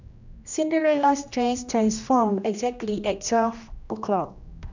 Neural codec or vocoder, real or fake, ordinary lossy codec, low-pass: codec, 16 kHz, 1 kbps, X-Codec, HuBERT features, trained on general audio; fake; none; 7.2 kHz